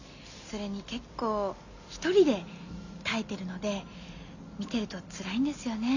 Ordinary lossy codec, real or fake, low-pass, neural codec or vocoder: none; real; 7.2 kHz; none